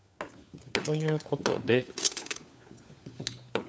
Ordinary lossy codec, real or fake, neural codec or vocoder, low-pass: none; fake; codec, 16 kHz, 4 kbps, FunCodec, trained on LibriTTS, 50 frames a second; none